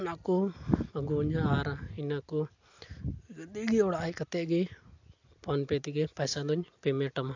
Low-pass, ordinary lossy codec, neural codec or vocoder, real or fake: 7.2 kHz; AAC, 48 kbps; none; real